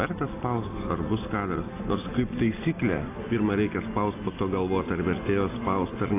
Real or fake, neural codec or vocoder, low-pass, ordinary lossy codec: real; none; 3.6 kHz; AAC, 24 kbps